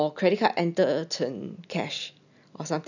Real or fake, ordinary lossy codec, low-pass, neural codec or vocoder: real; none; 7.2 kHz; none